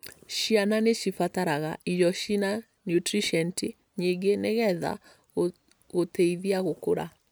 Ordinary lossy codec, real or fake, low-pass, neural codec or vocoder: none; real; none; none